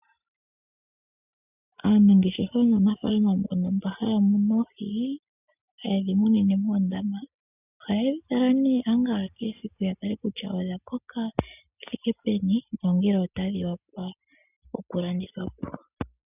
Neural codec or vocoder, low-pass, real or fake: none; 3.6 kHz; real